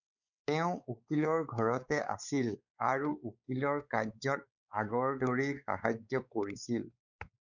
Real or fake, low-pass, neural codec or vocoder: fake; 7.2 kHz; codec, 16 kHz, 4 kbps, X-Codec, WavLM features, trained on Multilingual LibriSpeech